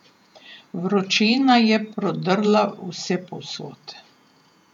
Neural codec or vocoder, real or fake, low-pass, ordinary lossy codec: vocoder, 48 kHz, 128 mel bands, Vocos; fake; 19.8 kHz; none